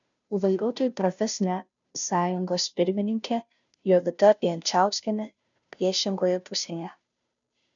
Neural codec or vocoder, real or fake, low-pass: codec, 16 kHz, 0.5 kbps, FunCodec, trained on Chinese and English, 25 frames a second; fake; 7.2 kHz